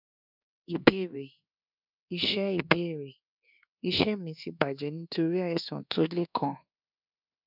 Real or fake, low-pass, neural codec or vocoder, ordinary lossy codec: fake; 5.4 kHz; codec, 24 kHz, 1.2 kbps, DualCodec; none